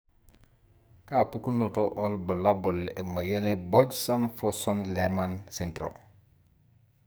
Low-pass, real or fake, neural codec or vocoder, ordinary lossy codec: none; fake; codec, 44.1 kHz, 2.6 kbps, SNAC; none